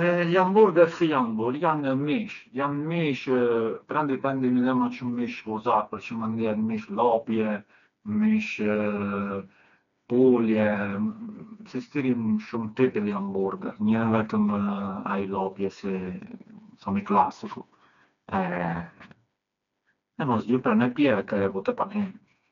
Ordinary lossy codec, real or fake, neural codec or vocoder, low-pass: none; fake; codec, 16 kHz, 2 kbps, FreqCodec, smaller model; 7.2 kHz